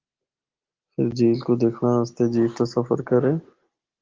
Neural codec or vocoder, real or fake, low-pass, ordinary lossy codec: none; real; 7.2 kHz; Opus, 16 kbps